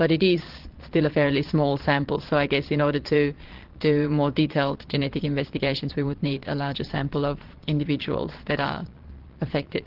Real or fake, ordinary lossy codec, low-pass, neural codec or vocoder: fake; Opus, 16 kbps; 5.4 kHz; vocoder, 22.05 kHz, 80 mel bands, Vocos